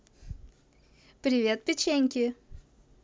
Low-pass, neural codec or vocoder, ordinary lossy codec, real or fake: none; none; none; real